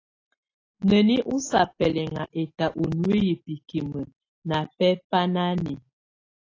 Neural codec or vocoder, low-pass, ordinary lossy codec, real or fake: none; 7.2 kHz; AAC, 32 kbps; real